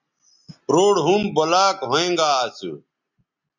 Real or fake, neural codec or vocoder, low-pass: real; none; 7.2 kHz